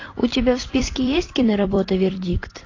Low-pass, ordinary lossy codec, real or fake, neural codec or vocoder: 7.2 kHz; AAC, 32 kbps; fake; vocoder, 44.1 kHz, 128 mel bands every 256 samples, BigVGAN v2